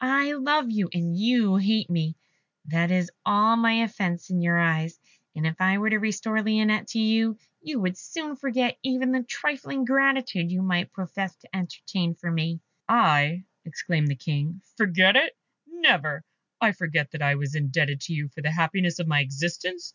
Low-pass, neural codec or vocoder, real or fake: 7.2 kHz; none; real